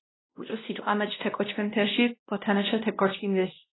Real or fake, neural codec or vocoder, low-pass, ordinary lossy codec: fake; codec, 16 kHz, 1 kbps, X-Codec, WavLM features, trained on Multilingual LibriSpeech; 7.2 kHz; AAC, 16 kbps